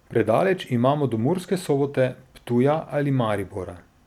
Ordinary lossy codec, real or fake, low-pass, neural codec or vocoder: none; real; 19.8 kHz; none